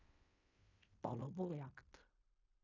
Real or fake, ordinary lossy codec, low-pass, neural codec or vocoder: fake; none; 7.2 kHz; codec, 16 kHz in and 24 kHz out, 0.4 kbps, LongCat-Audio-Codec, fine tuned four codebook decoder